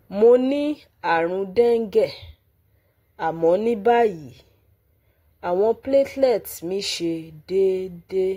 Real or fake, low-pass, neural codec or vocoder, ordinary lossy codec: real; 19.8 kHz; none; AAC, 48 kbps